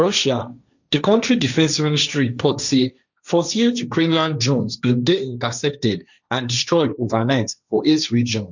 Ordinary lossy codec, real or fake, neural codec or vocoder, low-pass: none; fake; codec, 16 kHz, 1.1 kbps, Voila-Tokenizer; 7.2 kHz